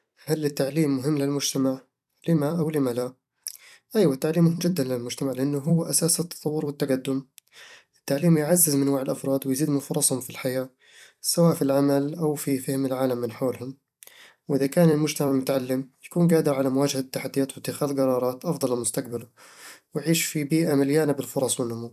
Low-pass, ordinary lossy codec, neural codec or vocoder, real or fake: 14.4 kHz; none; vocoder, 44.1 kHz, 128 mel bands every 256 samples, BigVGAN v2; fake